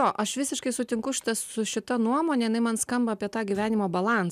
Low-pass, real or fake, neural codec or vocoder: 14.4 kHz; real; none